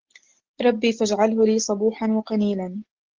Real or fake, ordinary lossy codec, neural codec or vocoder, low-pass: real; Opus, 16 kbps; none; 7.2 kHz